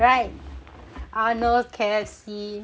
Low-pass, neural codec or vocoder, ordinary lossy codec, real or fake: none; none; none; real